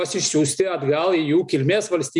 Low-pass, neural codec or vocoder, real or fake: 10.8 kHz; none; real